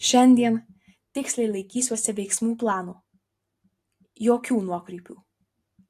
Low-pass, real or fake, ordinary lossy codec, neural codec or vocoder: 14.4 kHz; real; AAC, 64 kbps; none